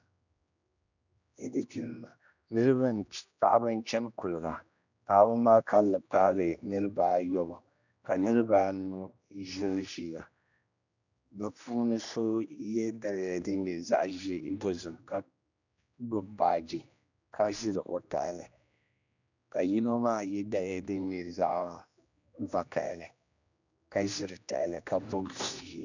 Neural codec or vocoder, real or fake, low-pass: codec, 16 kHz, 1 kbps, X-Codec, HuBERT features, trained on general audio; fake; 7.2 kHz